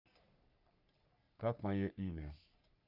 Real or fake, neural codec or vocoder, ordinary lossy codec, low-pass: fake; codec, 44.1 kHz, 3.4 kbps, Pupu-Codec; none; 5.4 kHz